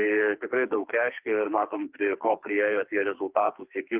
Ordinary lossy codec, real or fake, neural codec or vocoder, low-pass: Opus, 32 kbps; fake; codec, 44.1 kHz, 2.6 kbps, SNAC; 3.6 kHz